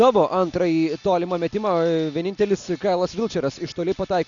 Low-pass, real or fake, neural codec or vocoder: 7.2 kHz; real; none